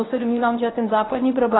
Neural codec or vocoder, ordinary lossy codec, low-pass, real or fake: codec, 24 kHz, 0.9 kbps, WavTokenizer, medium speech release version 1; AAC, 16 kbps; 7.2 kHz; fake